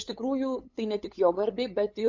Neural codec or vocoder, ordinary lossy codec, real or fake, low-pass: codec, 16 kHz, 8 kbps, FunCodec, trained on LibriTTS, 25 frames a second; MP3, 48 kbps; fake; 7.2 kHz